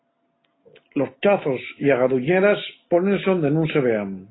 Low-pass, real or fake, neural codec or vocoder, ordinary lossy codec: 7.2 kHz; real; none; AAC, 16 kbps